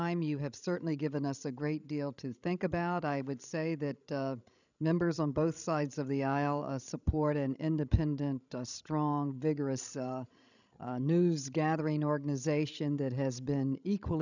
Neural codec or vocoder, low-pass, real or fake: codec, 16 kHz, 16 kbps, FreqCodec, larger model; 7.2 kHz; fake